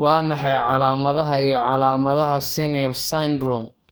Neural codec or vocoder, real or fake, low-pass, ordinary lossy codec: codec, 44.1 kHz, 2.6 kbps, DAC; fake; none; none